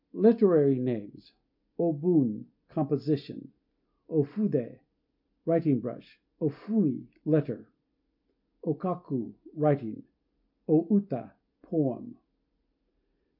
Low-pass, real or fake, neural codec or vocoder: 5.4 kHz; real; none